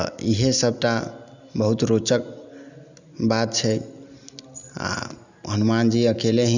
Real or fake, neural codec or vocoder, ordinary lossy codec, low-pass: real; none; none; 7.2 kHz